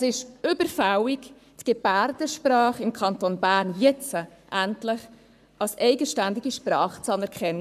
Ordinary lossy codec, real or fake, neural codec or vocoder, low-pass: none; fake; codec, 44.1 kHz, 7.8 kbps, Pupu-Codec; 14.4 kHz